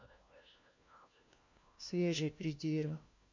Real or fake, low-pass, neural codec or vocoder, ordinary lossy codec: fake; 7.2 kHz; codec, 16 kHz, 1 kbps, FunCodec, trained on LibriTTS, 50 frames a second; MP3, 48 kbps